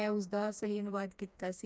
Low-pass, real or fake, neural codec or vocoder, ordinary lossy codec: none; fake; codec, 16 kHz, 2 kbps, FreqCodec, smaller model; none